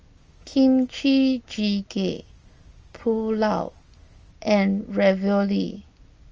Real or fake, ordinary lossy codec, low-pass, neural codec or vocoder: real; Opus, 24 kbps; 7.2 kHz; none